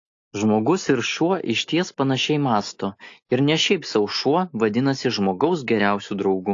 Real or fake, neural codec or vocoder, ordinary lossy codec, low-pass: real; none; AAC, 48 kbps; 7.2 kHz